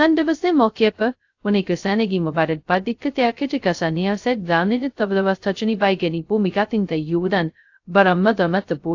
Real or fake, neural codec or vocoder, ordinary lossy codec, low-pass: fake; codec, 16 kHz, 0.2 kbps, FocalCodec; AAC, 48 kbps; 7.2 kHz